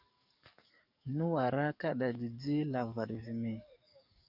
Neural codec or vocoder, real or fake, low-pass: codec, 44.1 kHz, 7.8 kbps, DAC; fake; 5.4 kHz